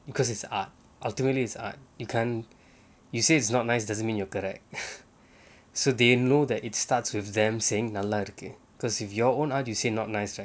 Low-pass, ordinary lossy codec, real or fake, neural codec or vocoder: none; none; real; none